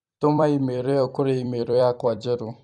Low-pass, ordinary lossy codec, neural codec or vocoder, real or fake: 10.8 kHz; none; vocoder, 44.1 kHz, 128 mel bands every 256 samples, BigVGAN v2; fake